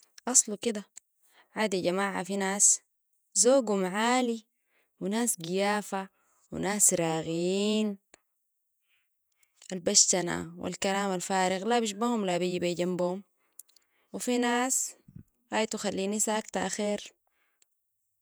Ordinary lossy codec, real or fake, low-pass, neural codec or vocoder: none; fake; none; vocoder, 48 kHz, 128 mel bands, Vocos